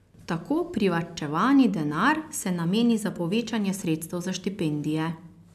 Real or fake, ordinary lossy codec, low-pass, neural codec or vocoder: real; MP3, 96 kbps; 14.4 kHz; none